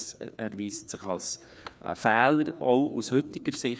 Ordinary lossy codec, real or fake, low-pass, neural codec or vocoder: none; fake; none; codec, 16 kHz, 2 kbps, FreqCodec, larger model